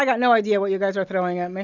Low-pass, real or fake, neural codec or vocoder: 7.2 kHz; real; none